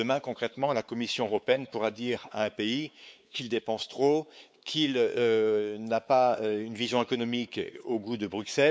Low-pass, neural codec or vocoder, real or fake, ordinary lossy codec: none; codec, 16 kHz, 4 kbps, X-Codec, WavLM features, trained on Multilingual LibriSpeech; fake; none